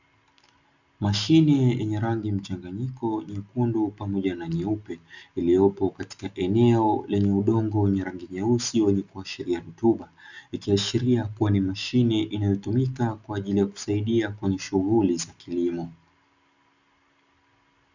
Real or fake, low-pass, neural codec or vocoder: real; 7.2 kHz; none